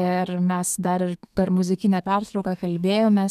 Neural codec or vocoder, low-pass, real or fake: codec, 32 kHz, 1.9 kbps, SNAC; 14.4 kHz; fake